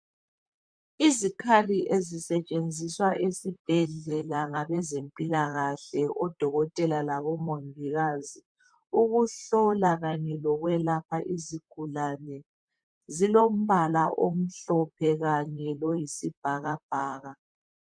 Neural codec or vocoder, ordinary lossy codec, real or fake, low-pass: vocoder, 44.1 kHz, 128 mel bands, Pupu-Vocoder; MP3, 96 kbps; fake; 9.9 kHz